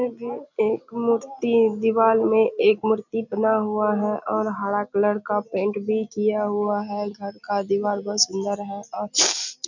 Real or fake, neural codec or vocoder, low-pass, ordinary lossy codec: real; none; 7.2 kHz; none